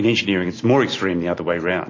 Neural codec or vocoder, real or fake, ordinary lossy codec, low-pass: none; real; MP3, 32 kbps; 7.2 kHz